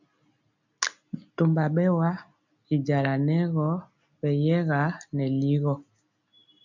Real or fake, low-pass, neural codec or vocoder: real; 7.2 kHz; none